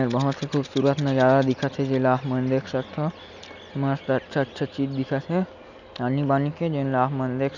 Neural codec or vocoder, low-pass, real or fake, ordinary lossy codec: none; 7.2 kHz; real; none